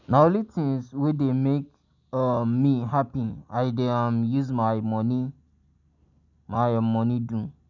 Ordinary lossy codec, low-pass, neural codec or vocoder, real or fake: none; 7.2 kHz; none; real